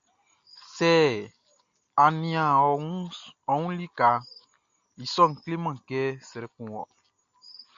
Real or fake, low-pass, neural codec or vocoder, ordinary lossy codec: real; 7.2 kHz; none; AAC, 64 kbps